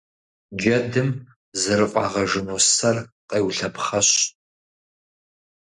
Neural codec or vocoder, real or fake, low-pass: none; real; 10.8 kHz